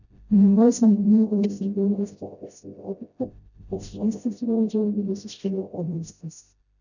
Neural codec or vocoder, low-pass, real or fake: codec, 16 kHz, 0.5 kbps, FreqCodec, smaller model; 7.2 kHz; fake